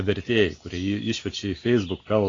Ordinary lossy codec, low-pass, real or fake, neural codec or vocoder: AAC, 32 kbps; 7.2 kHz; real; none